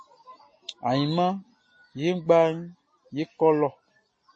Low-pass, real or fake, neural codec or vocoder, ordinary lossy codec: 9.9 kHz; real; none; MP3, 32 kbps